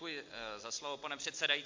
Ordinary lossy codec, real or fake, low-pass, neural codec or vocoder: MP3, 48 kbps; real; 7.2 kHz; none